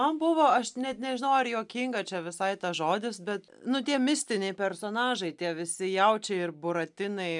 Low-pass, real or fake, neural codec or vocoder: 10.8 kHz; real; none